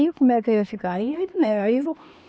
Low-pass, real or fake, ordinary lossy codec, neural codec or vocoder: none; fake; none; codec, 16 kHz, 2 kbps, X-Codec, HuBERT features, trained on LibriSpeech